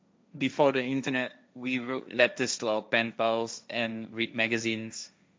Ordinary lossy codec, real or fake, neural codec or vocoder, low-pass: none; fake; codec, 16 kHz, 1.1 kbps, Voila-Tokenizer; none